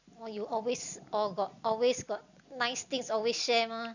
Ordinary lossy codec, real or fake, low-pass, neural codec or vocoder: none; real; 7.2 kHz; none